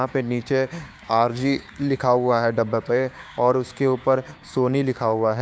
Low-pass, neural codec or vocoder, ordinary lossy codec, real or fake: none; codec, 16 kHz, 6 kbps, DAC; none; fake